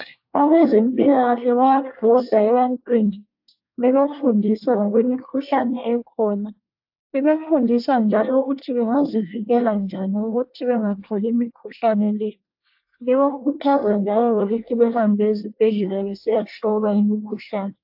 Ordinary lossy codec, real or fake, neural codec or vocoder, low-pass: AAC, 48 kbps; fake; codec, 24 kHz, 1 kbps, SNAC; 5.4 kHz